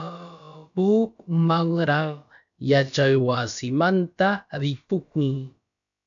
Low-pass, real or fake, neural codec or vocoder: 7.2 kHz; fake; codec, 16 kHz, about 1 kbps, DyCAST, with the encoder's durations